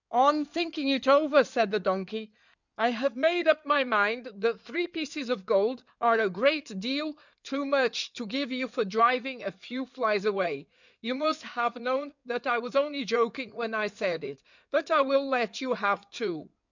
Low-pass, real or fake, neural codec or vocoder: 7.2 kHz; fake; codec, 16 kHz in and 24 kHz out, 2.2 kbps, FireRedTTS-2 codec